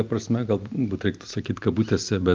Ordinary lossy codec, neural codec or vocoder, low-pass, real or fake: Opus, 24 kbps; none; 7.2 kHz; real